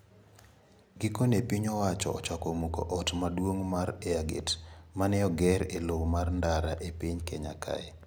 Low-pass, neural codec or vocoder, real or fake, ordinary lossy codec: none; none; real; none